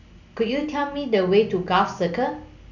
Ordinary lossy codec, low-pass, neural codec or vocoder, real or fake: none; 7.2 kHz; none; real